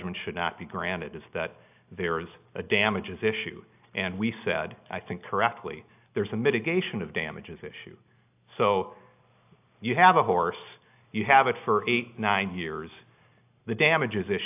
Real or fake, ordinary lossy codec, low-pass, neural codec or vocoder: real; AAC, 32 kbps; 3.6 kHz; none